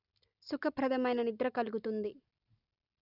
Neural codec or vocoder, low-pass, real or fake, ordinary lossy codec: none; 5.4 kHz; real; none